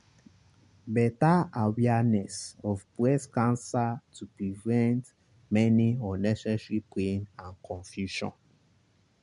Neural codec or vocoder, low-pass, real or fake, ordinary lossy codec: none; 10.8 kHz; real; MP3, 64 kbps